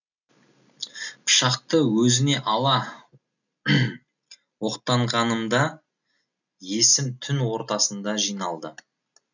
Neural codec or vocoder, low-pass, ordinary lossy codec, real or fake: none; 7.2 kHz; none; real